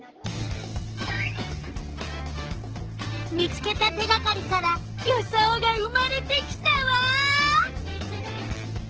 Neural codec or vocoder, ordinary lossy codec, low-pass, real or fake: codec, 44.1 kHz, 7.8 kbps, DAC; Opus, 16 kbps; 7.2 kHz; fake